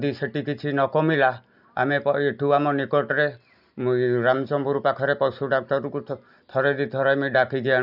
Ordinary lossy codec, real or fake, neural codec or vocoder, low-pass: none; real; none; 5.4 kHz